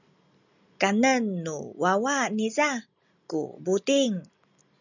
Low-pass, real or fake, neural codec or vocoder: 7.2 kHz; real; none